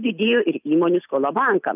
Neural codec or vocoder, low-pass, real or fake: none; 3.6 kHz; real